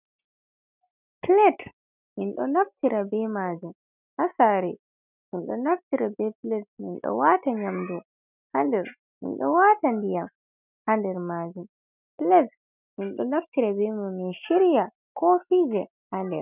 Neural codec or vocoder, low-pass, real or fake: none; 3.6 kHz; real